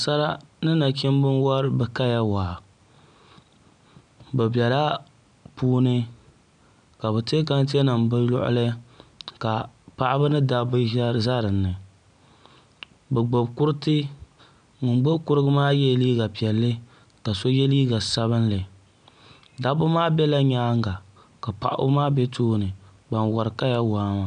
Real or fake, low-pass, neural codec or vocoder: real; 9.9 kHz; none